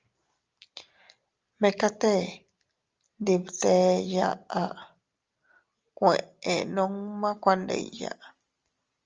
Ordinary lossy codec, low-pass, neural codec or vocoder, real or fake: Opus, 32 kbps; 7.2 kHz; none; real